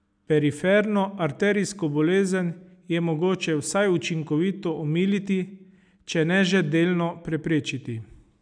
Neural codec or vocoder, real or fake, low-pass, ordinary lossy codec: none; real; 9.9 kHz; none